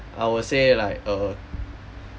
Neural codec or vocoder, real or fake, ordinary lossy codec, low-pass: none; real; none; none